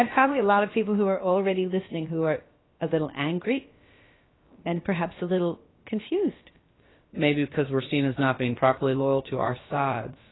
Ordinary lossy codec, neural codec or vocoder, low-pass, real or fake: AAC, 16 kbps; codec, 16 kHz, about 1 kbps, DyCAST, with the encoder's durations; 7.2 kHz; fake